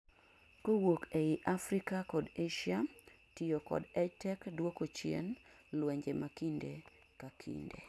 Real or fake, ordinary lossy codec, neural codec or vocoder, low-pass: real; none; none; none